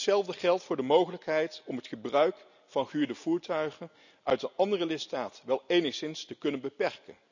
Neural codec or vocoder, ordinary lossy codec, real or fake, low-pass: none; none; real; 7.2 kHz